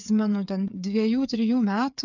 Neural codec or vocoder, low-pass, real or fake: codec, 16 kHz, 8 kbps, FreqCodec, smaller model; 7.2 kHz; fake